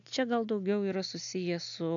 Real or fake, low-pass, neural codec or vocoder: real; 7.2 kHz; none